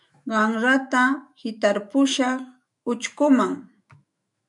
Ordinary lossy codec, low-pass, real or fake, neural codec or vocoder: MP3, 96 kbps; 10.8 kHz; fake; autoencoder, 48 kHz, 128 numbers a frame, DAC-VAE, trained on Japanese speech